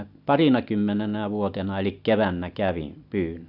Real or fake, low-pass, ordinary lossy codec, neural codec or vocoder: real; 5.4 kHz; none; none